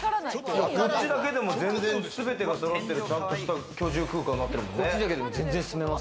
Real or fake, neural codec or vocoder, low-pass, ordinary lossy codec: real; none; none; none